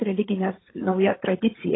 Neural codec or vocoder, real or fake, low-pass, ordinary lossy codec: codec, 16 kHz, 8 kbps, FreqCodec, larger model; fake; 7.2 kHz; AAC, 16 kbps